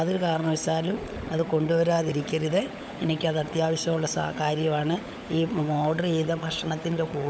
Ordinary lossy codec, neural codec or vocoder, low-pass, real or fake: none; codec, 16 kHz, 16 kbps, FunCodec, trained on LibriTTS, 50 frames a second; none; fake